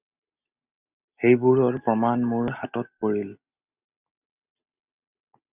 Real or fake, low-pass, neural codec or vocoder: real; 3.6 kHz; none